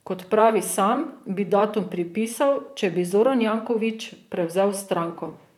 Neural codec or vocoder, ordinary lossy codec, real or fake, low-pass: vocoder, 44.1 kHz, 128 mel bands, Pupu-Vocoder; none; fake; 19.8 kHz